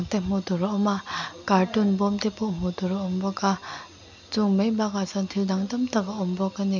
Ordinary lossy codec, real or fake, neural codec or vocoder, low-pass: none; real; none; 7.2 kHz